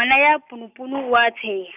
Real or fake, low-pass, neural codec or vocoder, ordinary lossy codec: real; 3.6 kHz; none; none